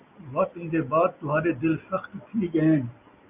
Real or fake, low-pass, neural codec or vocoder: real; 3.6 kHz; none